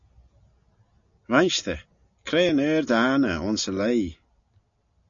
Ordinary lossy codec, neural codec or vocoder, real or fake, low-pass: AAC, 64 kbps; none; real; 7.2 kHz